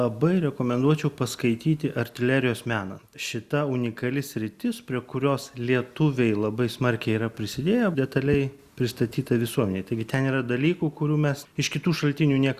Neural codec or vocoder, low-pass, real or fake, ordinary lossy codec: none; 14.4 kHz; real; Opus, 64 kbps